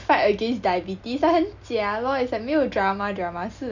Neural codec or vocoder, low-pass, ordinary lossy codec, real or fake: none; 7.2 kHz; none; real